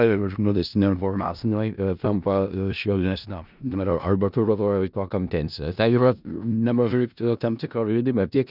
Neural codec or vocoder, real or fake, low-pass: codec, 16 kHz in and 24 kHz out, 0.4 kbps, LongCat-Audio-Codec, four codebook decoder; fake; 5.4 kHz